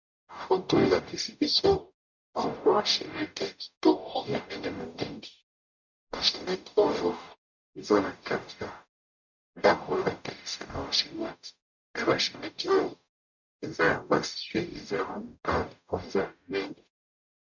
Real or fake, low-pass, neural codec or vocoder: fake; 7.2 kHz; codec, 44.1 kHz, 0.9 kbps, DAC